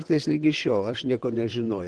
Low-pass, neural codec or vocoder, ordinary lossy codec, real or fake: 9.9 kHz; vocoder, 22.05 kHz, 80 mel bands, WaveNeXt; Opus, 16 kbps; fake